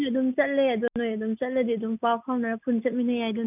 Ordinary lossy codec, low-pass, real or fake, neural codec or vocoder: none; 3.6 kHz; real; none